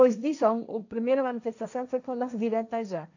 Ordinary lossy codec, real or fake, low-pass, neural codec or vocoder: none; fake; 7.2 kHz; codec, 16 kHz, 1.1 kbps, Voila-Tokenizer